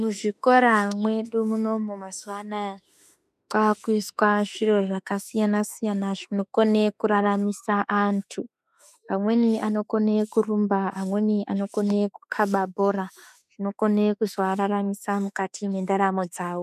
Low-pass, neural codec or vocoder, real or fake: 14.4 kHz; autoencoder, 48 kHz, 32 numbers a frame, DAC-VAE, trained on Japanese speech; fake